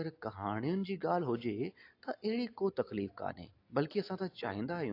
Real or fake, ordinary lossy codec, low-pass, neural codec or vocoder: real; AAC, 32 kbps; 5.4 kHz; none